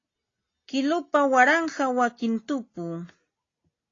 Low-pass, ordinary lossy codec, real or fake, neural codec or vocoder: 7.2 kHz; AAC, 32 kbps; real; none